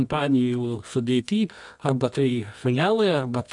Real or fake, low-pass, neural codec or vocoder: fake; 10.8 kHz; codec, 24 kHz, 0.9 kbps, WavTokenizer, medium music audio release